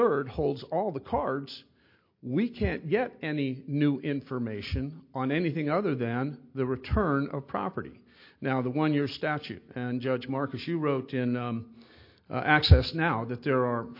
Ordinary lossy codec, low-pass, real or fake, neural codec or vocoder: MP3, 32 kbps; 5.4 kHz; real; none